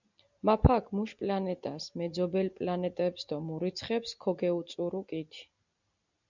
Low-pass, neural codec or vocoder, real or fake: 7.2 kHz; none; real